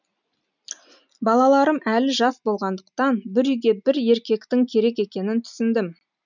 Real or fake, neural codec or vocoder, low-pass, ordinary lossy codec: real; none; 7.2 kHz; none